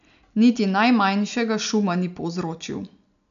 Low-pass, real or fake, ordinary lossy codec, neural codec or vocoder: 7.2 kHz; real; none; none